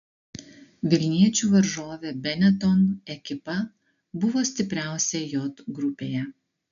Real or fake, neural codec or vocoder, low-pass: real; none; 7.2 kHz